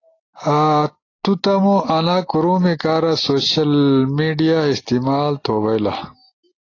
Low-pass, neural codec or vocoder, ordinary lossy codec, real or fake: 7.2 kHz; none; AAC, 32 kbps; real